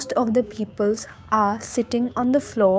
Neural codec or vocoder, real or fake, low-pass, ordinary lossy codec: codec, 16 kHz, 6 kbps, DAC; fake; none; none